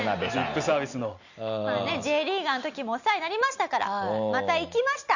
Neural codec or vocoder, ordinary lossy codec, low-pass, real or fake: none; none; 7.2 kHz; real